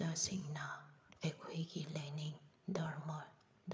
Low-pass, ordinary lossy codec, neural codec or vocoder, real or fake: none; none; codec, 16 kHz, 8 kbps, FreqCodec, larger model; fake